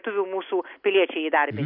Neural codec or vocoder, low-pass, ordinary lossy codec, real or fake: none; 5.4 kHz; AAC, 48 kbps; real